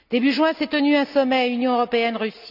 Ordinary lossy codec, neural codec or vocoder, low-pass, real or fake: none; none; 5.4 kHz; real